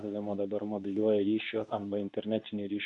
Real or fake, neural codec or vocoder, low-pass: fake; codec, 24 kHz, 0.9 kbps, WavTokenizer, medium speech release version 2; 10.8 kHz